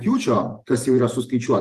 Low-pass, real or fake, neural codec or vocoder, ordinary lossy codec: 14.4 kHz; fake; vocoder, 48 kHz, 128 mel bands, Vocos; Opus, 24 kbps